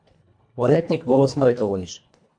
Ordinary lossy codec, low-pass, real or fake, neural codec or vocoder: MP3, 64 kbps; 9.9 kHz; fake; codec, 24 kHz, 1.5 kbps, HILCodec